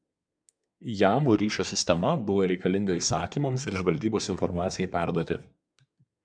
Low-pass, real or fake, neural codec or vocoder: 9.9 kHz; fake; codec, 24 kHz, 1 kbps, SNAC